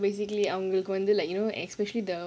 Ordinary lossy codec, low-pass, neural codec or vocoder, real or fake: none; none; none; real